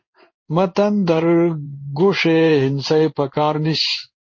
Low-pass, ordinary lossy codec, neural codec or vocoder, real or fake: 7.2 kHz; MP3, 32 kbps; codec, 16 kHz in and 24 kHz out, 1 kbps, XY-Tokenizer; fake